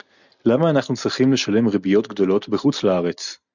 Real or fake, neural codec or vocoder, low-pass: real; none; 7.2 kHz